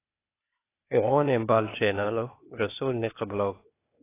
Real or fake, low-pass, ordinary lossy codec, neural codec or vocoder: fake; 3.6 kHz; AAC, 24 kbps; codec, 16 kHz, 0.8 kbps, ZipCodec